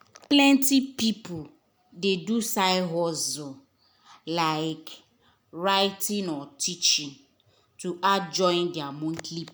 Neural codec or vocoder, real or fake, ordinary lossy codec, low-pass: none; real; none; none